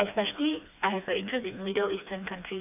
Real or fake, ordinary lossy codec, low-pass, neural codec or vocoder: fake; none; 3.6 kHz; codec, 16 kHz, 2 kbps, FreqCodec, smaller model